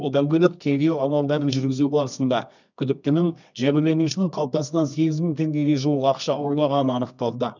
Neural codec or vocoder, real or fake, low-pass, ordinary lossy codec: codec, 24 kHz, 0.9 kbps, WavTokenizer, medium music audio release; fake; 7.2 kHz; none